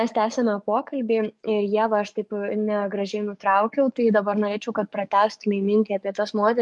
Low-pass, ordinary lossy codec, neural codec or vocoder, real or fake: 10.8 kHz; MP3, 64 kbps; codec, 44.1 kHz, 7.8 kbps, DAC; fake